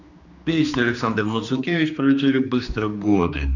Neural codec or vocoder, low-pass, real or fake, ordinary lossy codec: codec, 16 kHz, 2 kbps, X-Codec, HuBERT features, trained on general audio; 7.2 kHz; fake; none